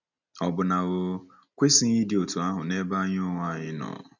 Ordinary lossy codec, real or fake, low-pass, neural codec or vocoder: none; real; 7.2 kHz; none